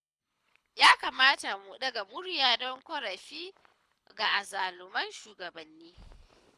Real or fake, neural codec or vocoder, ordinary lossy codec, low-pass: fake; codec, 24 kHz, 6 kbps, HILCodec; none; none